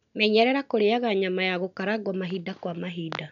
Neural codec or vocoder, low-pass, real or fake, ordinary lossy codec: none; 7.2 kHz; real; none